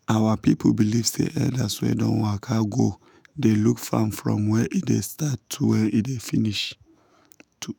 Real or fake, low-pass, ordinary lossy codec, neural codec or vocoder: fake; none; none; autoencoder, 48 kHz, 128 numbers a frame, DAC-VAE, trained on Japanese speech